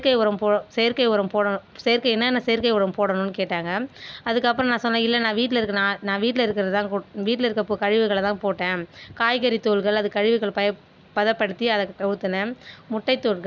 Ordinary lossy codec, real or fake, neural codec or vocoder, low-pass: none; real; none; none